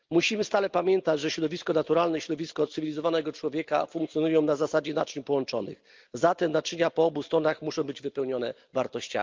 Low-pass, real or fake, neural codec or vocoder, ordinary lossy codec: 7.2 kHz; real; none; Opus, 32 kbps